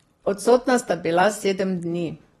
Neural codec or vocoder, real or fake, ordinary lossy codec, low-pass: vocoder, 44.1 kHz, 128 mel bands every 256 samples, BigVGAN v2; fake; AAC, 32 kbps; 19.8 kHz